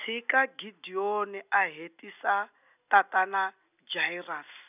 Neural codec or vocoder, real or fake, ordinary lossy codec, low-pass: none; real; none; 3.6 kHz